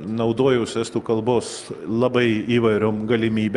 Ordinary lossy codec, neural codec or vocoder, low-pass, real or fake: Opus, 32 kbps; none; 10.8 kHz; real